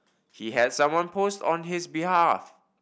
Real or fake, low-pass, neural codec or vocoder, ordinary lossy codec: real; none; none; none